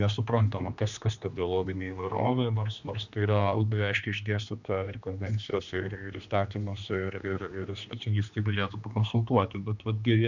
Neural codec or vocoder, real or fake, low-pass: codec, 16 kHz, 2 kbps, X-Codec, HuBERT features, trained on general audio; fake; 7.2 kHz